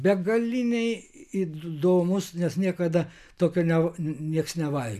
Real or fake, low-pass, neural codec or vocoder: real; 14.4 kHz; none